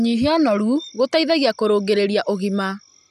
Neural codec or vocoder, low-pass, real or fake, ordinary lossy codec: none; 14.4 kHz; real; none